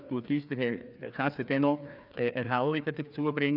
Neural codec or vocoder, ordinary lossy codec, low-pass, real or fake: codec, 16 kHz, 2 kbps, FreqCodec, larger model; none; 5.4 kHz; fake